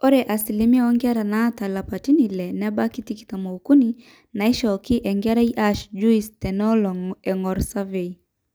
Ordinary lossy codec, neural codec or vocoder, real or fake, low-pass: none; none; real; none